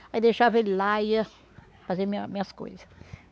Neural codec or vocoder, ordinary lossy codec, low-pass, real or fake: codec, 16 kHz, 4 kbps, X-Codec, WavLM features, trained on Multilingual LibriSpeech; none; none; fake